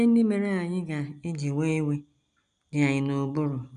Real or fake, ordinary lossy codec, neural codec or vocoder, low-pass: real; none; none; 9.9 kHz